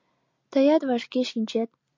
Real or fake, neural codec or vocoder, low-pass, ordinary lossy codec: real; none; 7.2 kHz; MP3, 48 kbps